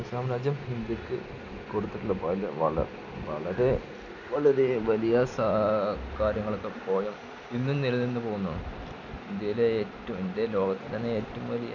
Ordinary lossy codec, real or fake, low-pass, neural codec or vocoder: none; real; 7.2 kHz; none